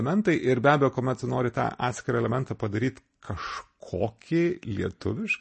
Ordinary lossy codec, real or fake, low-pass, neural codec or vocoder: MP3, 32 kbps; real; 10.8 kHz; none